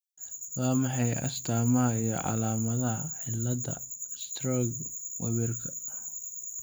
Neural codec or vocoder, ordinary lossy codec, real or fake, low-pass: none; none; real; none